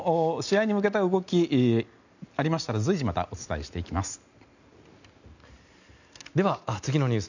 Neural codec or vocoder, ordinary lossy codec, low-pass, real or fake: none; none; 7.2 kHz; real